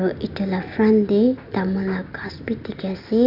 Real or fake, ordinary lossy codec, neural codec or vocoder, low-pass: real; AAC, 48 kbps; none; 5.4 kHz